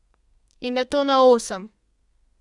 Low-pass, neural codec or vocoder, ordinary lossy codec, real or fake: 10.8 kHz; codec, 32 kHz, 1.9 kbps, SNAC; none; fake